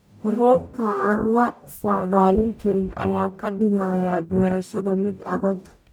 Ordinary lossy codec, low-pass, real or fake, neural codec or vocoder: none; none; fake; codec, 44.1 kHz, 0.9 kbps, DAC